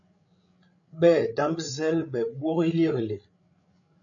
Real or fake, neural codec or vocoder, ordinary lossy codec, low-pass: fake; codec, 16 kHz, 8 kbps, FreqCodec, larger model; MP3, 64 kbps; 7.2 kHz